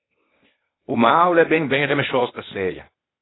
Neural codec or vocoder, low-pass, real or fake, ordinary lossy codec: codec, 16 kHz, 0.8 kbps, ZipCodec; 7.2 kHz; fake; AAC, 16 kbps